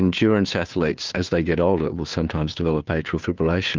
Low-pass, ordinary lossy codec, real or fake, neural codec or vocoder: 7.2 kHz; Opus, 32 kbps; fake; codec, 16 kHz, 2 kbps, FunCodec, trained on Chinese and English, 25 frames a second